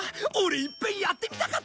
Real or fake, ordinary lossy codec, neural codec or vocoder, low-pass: real; none; none; none